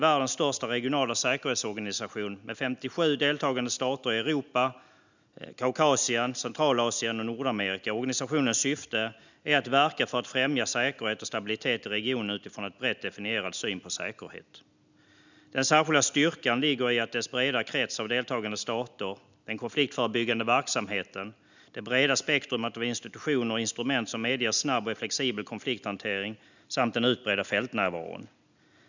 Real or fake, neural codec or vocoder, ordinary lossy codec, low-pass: real; none; none; 7.2 kHz